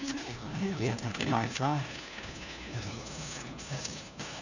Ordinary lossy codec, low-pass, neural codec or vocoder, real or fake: none; 7.2 kHz; codec, 16 kHz, 1 kbps, FunCodec, trained on LibriTTS, 50 frames a second; fake